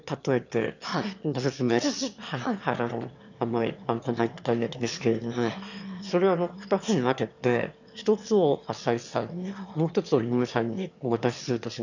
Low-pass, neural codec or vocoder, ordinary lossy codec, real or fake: 7.2 kHz; autoencoder, 22.05 kHz, a latent of 192 numbers a frame, VITS, trained on one speaker; none; fake